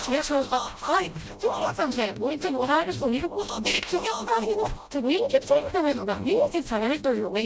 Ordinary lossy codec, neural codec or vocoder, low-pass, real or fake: none; codec, 16 kHz, 0.5 kbps, FreqCodec, smaller model; none; fake